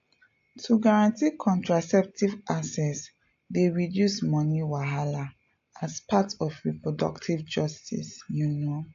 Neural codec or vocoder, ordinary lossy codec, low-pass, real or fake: none; AAC, 48 kbps; 7.2 kHz; real